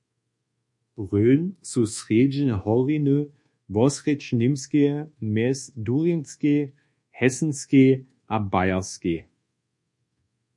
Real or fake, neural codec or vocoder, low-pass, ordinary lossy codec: fake; codec, 24 kHz, 1.2 kbps, DualCodec; 10.8 kHz; MP3, 48 kbps